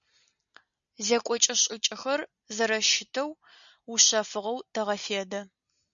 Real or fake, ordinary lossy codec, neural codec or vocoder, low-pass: real; MP3, 96 kbps; none; 7.2 kHz